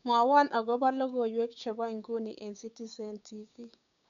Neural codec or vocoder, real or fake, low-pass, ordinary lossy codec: codec, 16 kHz, 6 kbps, DAC; fake; 7.2 kHz; none